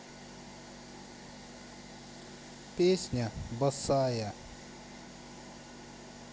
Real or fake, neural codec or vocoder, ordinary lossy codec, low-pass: real; none; none; none